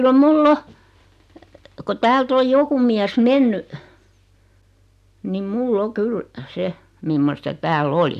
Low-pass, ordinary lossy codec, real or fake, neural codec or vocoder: 14.4 kHz; none; real; none